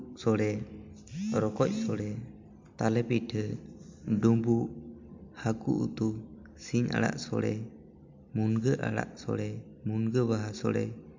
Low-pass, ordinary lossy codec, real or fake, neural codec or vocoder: 7.2 kHz; MP3, 64 kbps; real; none